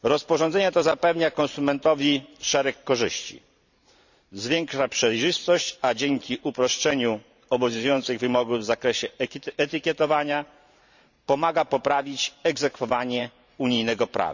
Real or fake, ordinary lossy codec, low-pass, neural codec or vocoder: fake; none; 7.2 kHz; vocoder, 44.1 kHz, 128 mel bands every 512 samples, BigVGAN v2